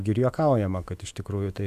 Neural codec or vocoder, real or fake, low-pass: autoencoder, 48 kHz, 128 numbers a frame, DAC-VAE, trained on Japanese speech; fake; 14.4 kHz